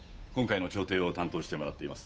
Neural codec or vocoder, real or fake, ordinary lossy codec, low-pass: codec, 16 kHz, 8 kbps, FunCodec, trained on Chinese and English, 25 frames a second; fake; none; none